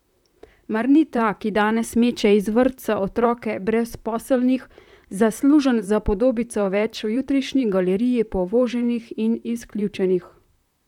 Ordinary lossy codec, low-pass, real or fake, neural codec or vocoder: none; 19.8 kHz; fake; vocoder, 44.1 kHz, 128 mel bands, Pupu-Vocoder